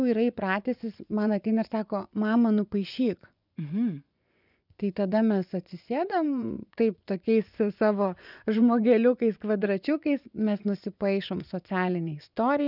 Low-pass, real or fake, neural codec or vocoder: 5.4 kHz; fake; vocoder, 24 kHz, 100 mel bands, Vocos